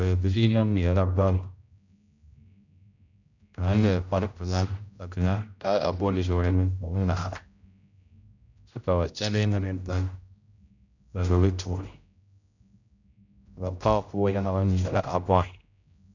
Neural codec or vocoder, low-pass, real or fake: codec, 16 kHz, 0.5 kbps, X-Codec, HuBERT features, trained on general audio; 7.2 kHz; fake